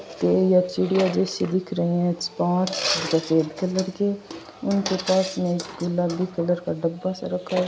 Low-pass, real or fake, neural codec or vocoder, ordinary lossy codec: none; real; none; none